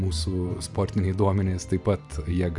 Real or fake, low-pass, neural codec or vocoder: real; 10.8 kHz; none